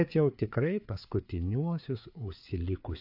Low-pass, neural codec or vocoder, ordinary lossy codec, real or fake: 5.4 kHz; codec, 16 kHz, 4 kbps, FreqCodec, larger model; AAC, 48 kbps; fake